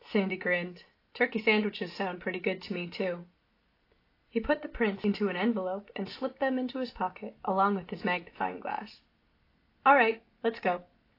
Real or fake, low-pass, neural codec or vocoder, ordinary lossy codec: real; 5.4 kHz; none; AAC, 32 kbps